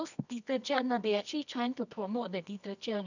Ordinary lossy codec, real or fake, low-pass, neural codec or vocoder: none; fake; 7.2 kHz; codec, 24 kHz, 0.9 kbps, WavTokenizer, medium music audio release